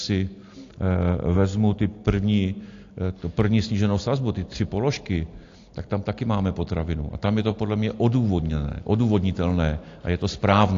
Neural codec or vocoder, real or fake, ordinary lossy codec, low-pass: none; real; AAC, 48 kbps; 7.2 kHz